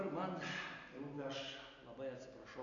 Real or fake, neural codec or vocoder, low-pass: real; none; 7.2 kHz